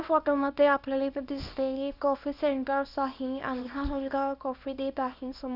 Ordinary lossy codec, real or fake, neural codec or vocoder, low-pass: none; fake; codec, 24 kHz, 0.9 kbps, WavTokenizer, small release; 5.4 kHz